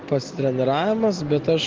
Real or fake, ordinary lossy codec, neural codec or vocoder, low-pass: real; Opus, 16 kbps; none; 7.2 kHz